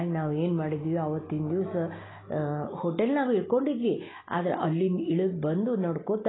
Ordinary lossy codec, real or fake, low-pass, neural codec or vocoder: AAC, 16 kbps; real; 7.2 kHz; none